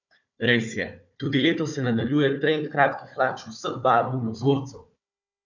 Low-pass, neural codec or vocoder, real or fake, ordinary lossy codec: 7.2 kHz; codec, 16 kHz, 4 kbps, FunCodec, trained on Chinese and English, 50 frames a second; fake; none